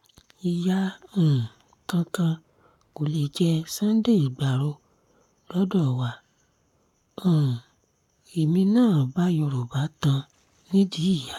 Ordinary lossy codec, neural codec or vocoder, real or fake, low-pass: none; codec, 44.1 kHz, 7.8 kbps, Pupu-Codec; fake; 19.8 kHz